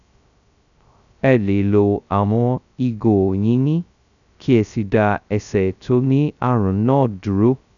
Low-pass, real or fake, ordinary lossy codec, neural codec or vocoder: 7.2 kHz; fake; none; codec, 16 kHz, 0.2 kbps, FocalCodec